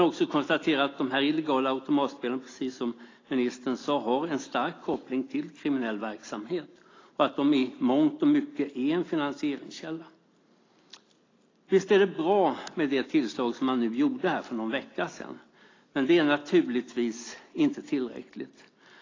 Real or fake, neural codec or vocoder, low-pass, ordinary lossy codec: real; none; 7.2 kHz; AAC, 32 kbps